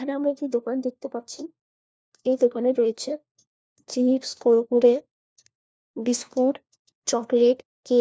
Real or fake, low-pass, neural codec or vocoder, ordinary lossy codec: fake; none; codec, 16 kHz, 1 kbps, FunCodec, trained on LibriTTS, 50 frames a second; none